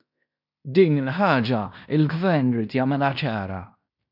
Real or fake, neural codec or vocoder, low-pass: fake; codec, 16 kHz in and 24 kHz out, 0.9 kbps, LongCat-Audio-Codec, fine tuned four codebook decoder; 5.4 kHz